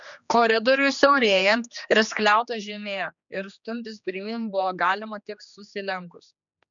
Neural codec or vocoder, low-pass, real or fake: codec, 16 kHz, 2 kbps, X-Codec, HuBERT features, trained on general audio; 7.2 kHz; fake